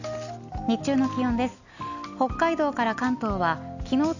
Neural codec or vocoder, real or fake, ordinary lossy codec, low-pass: none; real; none; 7.2 kHz